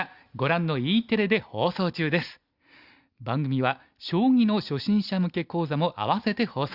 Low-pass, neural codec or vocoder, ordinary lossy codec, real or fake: 5.4 kHz; none; Opus, 64 kbps; real